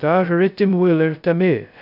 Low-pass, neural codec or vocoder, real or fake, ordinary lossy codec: 5.4 kHz; codec, 16 kHz, 0.2 kbps, FocalCodec; fake; none